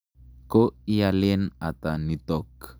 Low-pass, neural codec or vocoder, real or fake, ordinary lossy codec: none; none; real; none